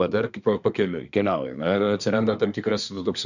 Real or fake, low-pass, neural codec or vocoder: fake; 7.2 kHz; codec, 24 kHz, 1 kbps, SNAC